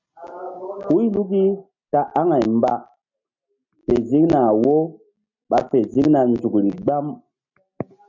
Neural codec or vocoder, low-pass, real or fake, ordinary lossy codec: none; 7.2 kHz; real; MP3, 48 kbps